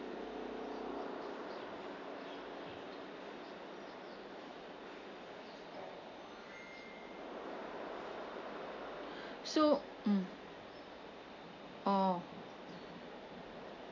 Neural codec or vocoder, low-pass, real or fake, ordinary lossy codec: none; 7.2 kHz; real; none